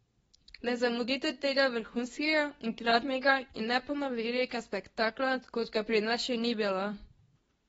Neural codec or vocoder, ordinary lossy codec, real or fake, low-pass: codec, 24 kHz, 0.9 kbps, WavTokenizer, medium speech release version 2; AAC, 24 kbps; fake; 10.8 kHz